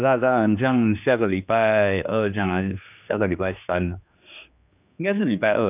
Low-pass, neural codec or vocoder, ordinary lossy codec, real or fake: 3.6 kHz; codec, 16 kHz, 2 kbps, X-Codec, HuBERT features, trained on general audio; none; fake